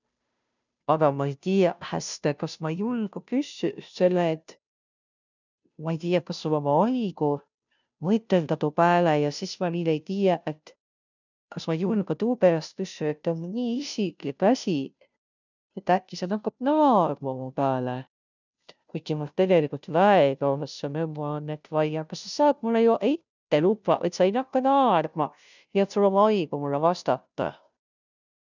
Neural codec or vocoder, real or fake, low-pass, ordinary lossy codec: codec, 16 kHz, 0.5 kbps, FunCodec, trained on Chinese and English, 25 frames a second; fake; 7.2 kHz; none